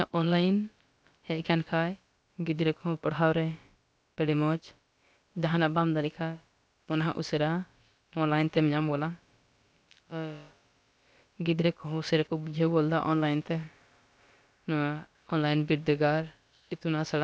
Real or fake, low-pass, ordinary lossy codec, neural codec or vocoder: fake; none; none; codec, 16 kHz, about 1 kbps, DyCAST, with the encoder's durations